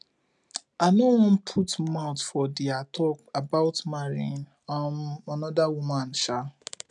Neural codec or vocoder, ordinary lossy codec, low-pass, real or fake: none; none; 10.8 kHz; real